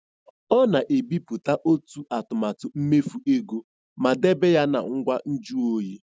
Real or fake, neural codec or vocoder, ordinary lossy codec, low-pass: real; none; none; none